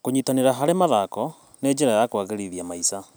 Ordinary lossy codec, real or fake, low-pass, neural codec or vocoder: none; real; none; none